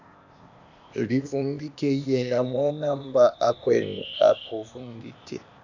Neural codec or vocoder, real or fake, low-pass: codec, 16 kHz, 0.8 kbps, ZipCodec; fake; 7.2 kHz